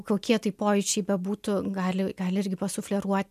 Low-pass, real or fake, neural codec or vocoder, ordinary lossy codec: 14.4 kHz; real; none; MP3, 96 kbps